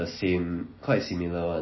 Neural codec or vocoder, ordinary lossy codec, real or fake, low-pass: none; MP3, 24 kbps; real; 7.2 kHz